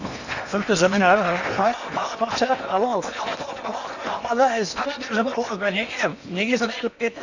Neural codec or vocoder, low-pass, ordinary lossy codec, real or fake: codec, 16 kHz in and 24 kHz out, 0.8 kbps, FocalCodec, streaming, 65536 codes; 7.2 kHz; none; fake